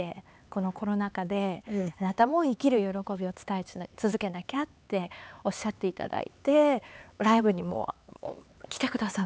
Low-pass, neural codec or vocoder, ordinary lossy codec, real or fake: none; codec, 16 kHz, 4 kbps, X-Codec, HuBERT features, trained on LibriSpeech; none; fake